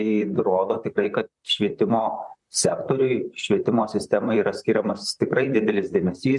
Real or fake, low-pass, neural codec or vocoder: fake; 10.8 kHz; vocoder, 44.1 kHz, 128 mel bands, Pupu-Vocoder